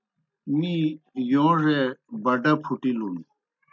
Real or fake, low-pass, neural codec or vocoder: real; 7.2 kHz; none